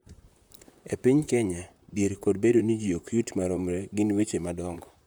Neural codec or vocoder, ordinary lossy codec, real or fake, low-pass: vocoder, 44.1 kHz, 128 mel bands, Pupu-Vocoder; none; fake; none